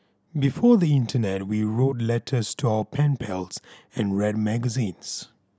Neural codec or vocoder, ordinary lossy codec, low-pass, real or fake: none; none; none; real